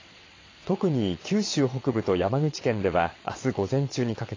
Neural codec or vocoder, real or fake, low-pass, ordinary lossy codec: vocoder, 22.05 kHz, 80 mel bands, WaveNeXt; fake; 7.2 kHz; AAC, 32 kbps